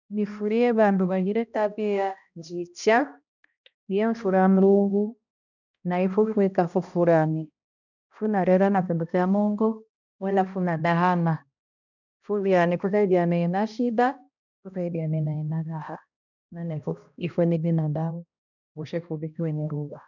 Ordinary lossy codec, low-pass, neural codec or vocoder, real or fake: none; 7.2 kHz; codec, 16 kHz, 1 kbps, X-Codec, HuBERT features, trained on balanced general audio; fake